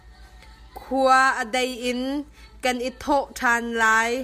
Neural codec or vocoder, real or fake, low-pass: none; real; 14.4 kHz